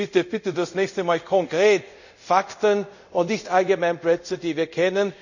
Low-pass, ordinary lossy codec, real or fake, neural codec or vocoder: 7.2 kHz; none; fake; codec, 24 kHz, 0.5 kbps, DualCodec